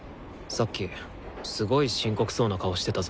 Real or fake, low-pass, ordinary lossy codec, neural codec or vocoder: real; none; none; none